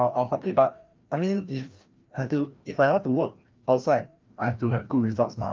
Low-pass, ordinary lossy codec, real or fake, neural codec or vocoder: 7.2 kHz; Opus, 24 kbps; fake; codec, 16 kHz, 1 kbps, FreqCodec, larger model